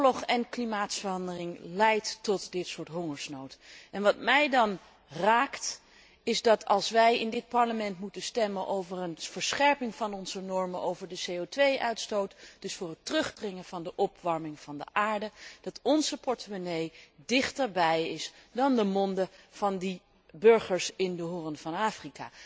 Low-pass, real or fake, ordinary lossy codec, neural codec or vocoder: none; real; none; none